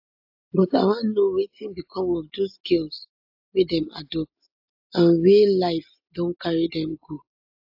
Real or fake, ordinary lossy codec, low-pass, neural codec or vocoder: real; AAC, 48 kbps; 5.4 kHz; none